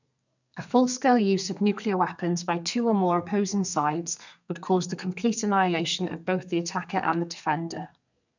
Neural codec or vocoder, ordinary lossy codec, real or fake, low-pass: codec, 32 kHz, 1.9 kbps, SNAC; none; fake; 7.2 kHz